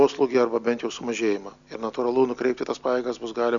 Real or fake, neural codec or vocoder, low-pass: real; none; 7.2 kHz